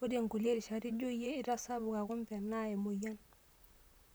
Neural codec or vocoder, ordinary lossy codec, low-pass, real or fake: vocoder, 44.1 kHz, 128 mel bands, Pupu-Vocoder; none; none; fake